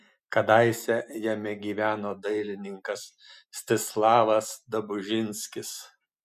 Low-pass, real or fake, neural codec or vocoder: 14.4 kHz; real; none